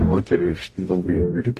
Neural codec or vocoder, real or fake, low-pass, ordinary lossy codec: codec, 44.1 kHz, 0.9 kbps, DAC; fake; 14.4 kHz; AAC, 48 kbps